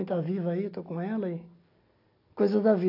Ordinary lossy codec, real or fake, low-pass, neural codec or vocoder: AAC, 32 kbps; real; 5.4 kHz; none